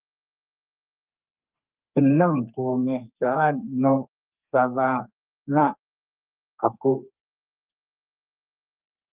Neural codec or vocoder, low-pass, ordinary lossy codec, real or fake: codec, 32 kHz, 1.9 kbps, SNAC; 3.6 kHz; Opus, 24 kbps; fake